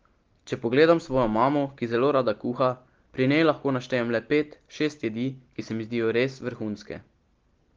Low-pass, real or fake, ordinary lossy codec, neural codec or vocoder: 7.2 kHz; real; Opus, 16 kbps; none